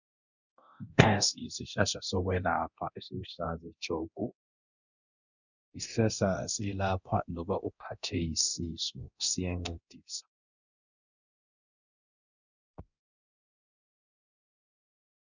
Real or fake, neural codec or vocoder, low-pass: fake; codec, 24 kHz, 0.9 kbps, DualCodec; 7.2 kHz